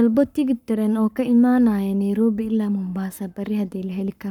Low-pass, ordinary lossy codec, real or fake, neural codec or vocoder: 19.8 kHz; none; fake; codec, 44.1 kHz, 7.8 kbps, Pupu-Codec